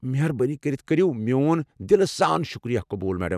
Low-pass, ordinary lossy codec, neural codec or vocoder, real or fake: 14.4 kHz; none; none; real